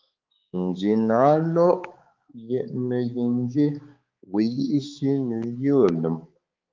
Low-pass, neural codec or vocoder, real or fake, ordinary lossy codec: 7.2 kHz; codec, 16 kHz, 4 kbps, X-Codec, HuBERT features, trained on balanced general audio; fake; Opus, 32 kbps